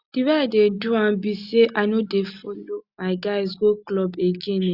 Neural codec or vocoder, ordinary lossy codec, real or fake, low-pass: none; Opus, 64 kbps; real; 5.4 kHz